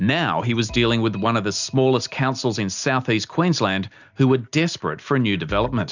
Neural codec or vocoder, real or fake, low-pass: none; real; 7.2 kHz